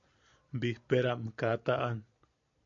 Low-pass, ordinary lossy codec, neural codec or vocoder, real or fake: 7.2 kHz; AAC, 64 kbps; none; real